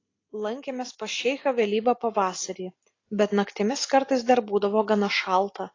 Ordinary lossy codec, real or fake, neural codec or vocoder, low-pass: AAC, 32 kbps; real; none; 7.2 kHz